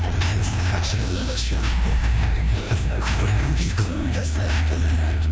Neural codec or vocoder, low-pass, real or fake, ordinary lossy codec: codec, 16 kHz, 1 kbps, FreqCodec, larger model; none; fake; none